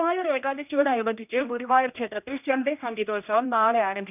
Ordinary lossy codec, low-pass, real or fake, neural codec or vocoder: none; 3.6 kHz; fake; codec, 16 kHz, 1 kbps, X-Codec, HuBERT features, trained on general audio